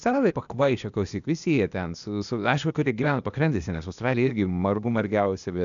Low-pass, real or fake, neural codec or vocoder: 7.2 kHz; fake; codec, 16 kHz, 0.8 kbps, ZipCodec